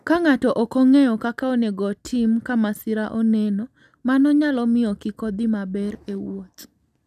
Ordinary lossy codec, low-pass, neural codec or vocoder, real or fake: none; 14.4 kHz; none; real